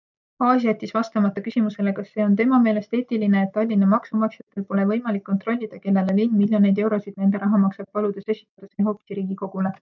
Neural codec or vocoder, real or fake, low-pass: none; real; 7.2 kHz